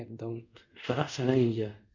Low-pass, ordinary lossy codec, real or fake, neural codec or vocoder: 7.2 kHz; none; fake; codec, 24 kHz, 0.5 kbps, DualCodec